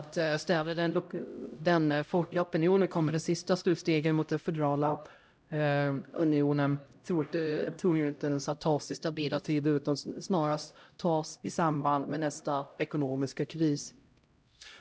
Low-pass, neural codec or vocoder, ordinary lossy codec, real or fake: none; codec, 16 kHz, 0.5 kbps, X-Codec, HuBERT features, trained on LibriSpeech; none; fake